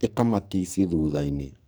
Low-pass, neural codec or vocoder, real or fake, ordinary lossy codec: none; codec, 44.1 kHz, 2.6 kbps, SNAC; fake; none